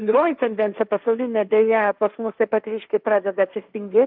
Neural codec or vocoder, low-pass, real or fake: codec, 16 kHz, 1.1 kbps, Voila-Tokenizer; 5.4 kHz; fake